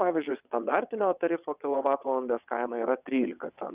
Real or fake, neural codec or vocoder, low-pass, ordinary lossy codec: fake; vocoder, 22.05 kHz, 80 mel bands, WaveNeXt; 3.6 kHz; Opus, 64 kbps